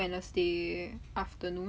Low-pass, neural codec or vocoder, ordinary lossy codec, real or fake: none; none; none; real